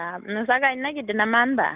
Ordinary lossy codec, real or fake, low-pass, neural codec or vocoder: Opus, 32 kbps; real; 3.6 kHz; none